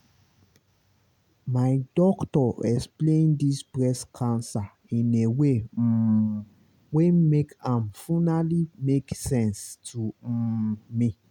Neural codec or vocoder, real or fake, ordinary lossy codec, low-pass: none; real; none; 19.8 kHz